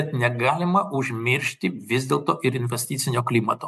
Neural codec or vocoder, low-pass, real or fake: none; 14.4 kHz; real